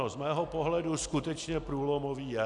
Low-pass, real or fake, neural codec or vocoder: 10.8 kHz; real; none